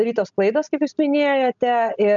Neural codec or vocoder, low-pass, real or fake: none; 7.2 kHz; real